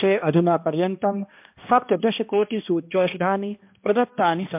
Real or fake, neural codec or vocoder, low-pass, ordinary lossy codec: fake; codec, 16 kHz, 1 kbps, X-Codec, HuBERT features, trained on balanced general audio; 3.6 kHz; MP3, 32 kbps